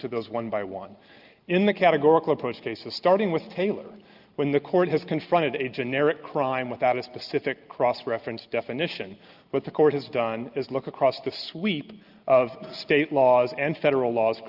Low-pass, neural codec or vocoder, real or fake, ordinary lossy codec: 5.4 kHz; none; real; Opus, 24 kbps